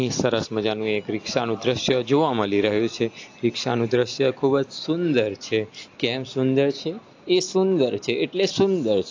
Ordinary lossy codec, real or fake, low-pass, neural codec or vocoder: AAC, 48 kbps; real; 7.2 kHz; none